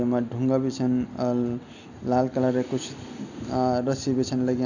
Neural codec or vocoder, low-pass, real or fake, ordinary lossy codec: none; 7.2 kHz; real; none